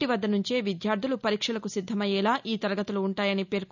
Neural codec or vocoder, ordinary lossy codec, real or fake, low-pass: none; none; real; 7.2 kHz